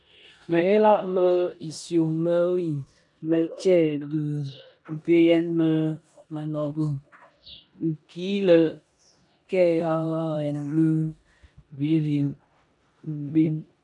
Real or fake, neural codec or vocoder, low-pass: fake; codec, 16 kHz in and 24 kHz out, 0.9 kbps, LongCat-Audio-Codec, four codebook decoder; 10.8 kHz